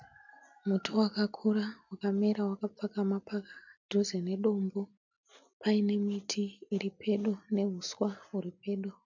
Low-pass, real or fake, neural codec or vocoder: 7.2 kHz; real; none